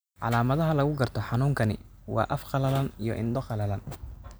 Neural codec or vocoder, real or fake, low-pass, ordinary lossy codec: none; real; none; none